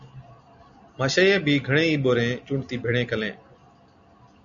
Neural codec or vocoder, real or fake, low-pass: none; real; 7.2 kHz